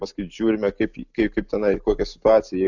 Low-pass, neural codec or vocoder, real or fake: 7.2 kHz; none; real